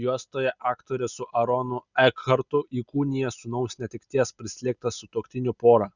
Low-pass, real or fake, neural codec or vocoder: 7.2 kHz; real; none